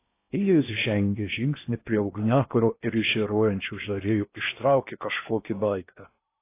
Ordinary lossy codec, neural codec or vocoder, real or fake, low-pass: AAC, 24 kbps; codec, 16 kHz in and 24 kHz out, 0.6 kbps, FocalCodec, streaming, 4096 codes; fake; 3.6 kHz